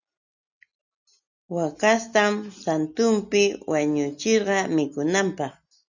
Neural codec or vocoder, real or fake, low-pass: none; real; 7.2 kHz